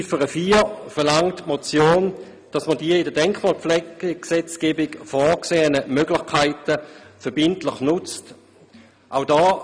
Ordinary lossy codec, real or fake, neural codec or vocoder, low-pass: none; real; none; none